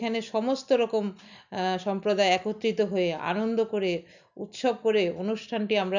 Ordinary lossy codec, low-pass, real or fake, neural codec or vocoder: none; 7.2 kHz; real; none